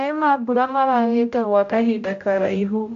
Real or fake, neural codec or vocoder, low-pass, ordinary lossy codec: fake; codec, 16 kHz, 0.5 kbps, X-Codec, HuBERT features, trained on general audio; 7.2 kHz; AAC, 64 kbps